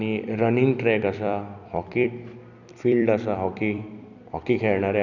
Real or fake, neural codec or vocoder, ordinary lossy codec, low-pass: fake; vocoder, 44.1 kHz, 128 mel bands every 256 samples, BigVGAN v2; none; 7.2 kHz